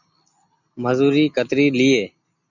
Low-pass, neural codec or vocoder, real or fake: 7.2 kHz; none; real